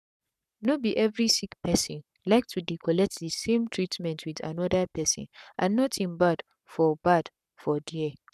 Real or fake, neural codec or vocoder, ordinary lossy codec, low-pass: fake; codec, 44.1 kHz, 7.8 kbps, Pupu-Codec; none; 14.4 kHz